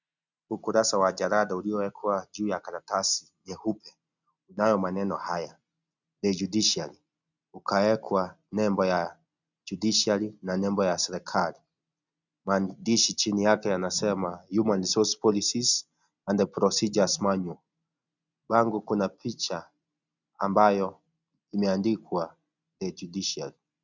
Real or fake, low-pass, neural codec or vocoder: real; 7.2 kHz; none